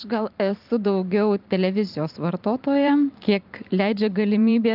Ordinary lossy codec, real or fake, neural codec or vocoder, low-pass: Opus, 24 kbps; fake; vocoder, 44.1 kHz, 128 mel bands every 512 samples, BigVGAN v2; 5.4 kHz